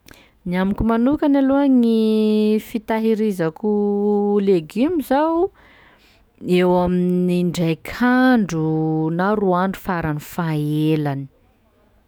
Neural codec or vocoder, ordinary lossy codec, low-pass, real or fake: autoencoder, 48 kHz, 128 numbers a frame, DAC-VAE, trained on Japanese speech; none; none; fake